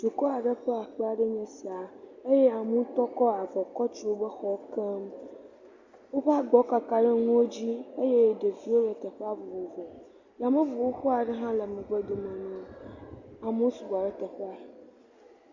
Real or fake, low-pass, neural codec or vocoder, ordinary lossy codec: real; 7.2 kHz; none; Opus, 64 kbps